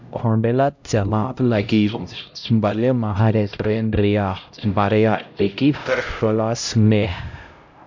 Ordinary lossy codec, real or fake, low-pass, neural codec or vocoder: MP3, 64 kbps; fake; 7.2 kHz; codec, 16 kHz, 0.5 kbps, X-Codec, HuBERT features, trained on LibriSpeech